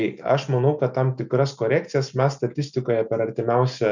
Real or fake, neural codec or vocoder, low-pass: real; none; 7.2 kHz